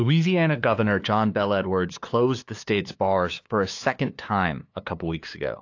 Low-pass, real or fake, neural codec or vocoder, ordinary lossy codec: 7.2 kHz; fake; codec, 16 kHz, 2 kbps, FunCodec, trained on LibriTTS, 25 frames a second; AAC, 48 kbps